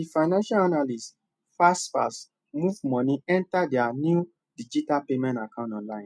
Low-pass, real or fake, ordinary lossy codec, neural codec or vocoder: none; real; none; none